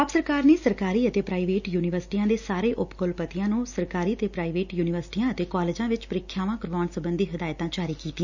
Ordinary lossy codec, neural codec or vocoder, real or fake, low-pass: none; none; real; 7.2 kHz